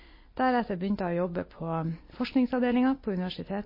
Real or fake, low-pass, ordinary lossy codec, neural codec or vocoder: real; 5.4 kHz; MP3, 24 kbps; none